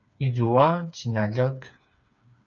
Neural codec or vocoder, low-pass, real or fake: codec, 16 kHz, 4 kbps, FreqCodec, smaller model; 7.2 kHz; fake